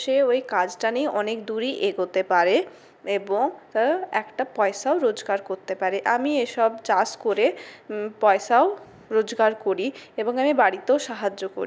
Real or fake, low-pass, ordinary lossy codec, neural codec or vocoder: real; none; none; none